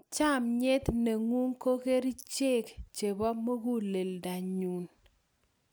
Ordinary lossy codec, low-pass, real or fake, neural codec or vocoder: none; none; real; none